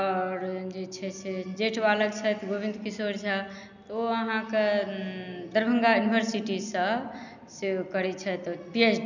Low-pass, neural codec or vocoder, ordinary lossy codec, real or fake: 7.2 kHz; none; none; real